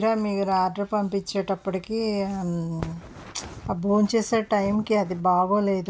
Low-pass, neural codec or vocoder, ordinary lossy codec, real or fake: none; none; none; real